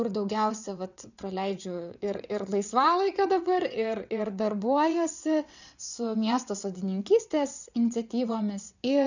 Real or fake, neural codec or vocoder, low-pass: fake; vocoder, 22.05 kHz, 80 mel bands, WaveNeXt; 7.2 kHz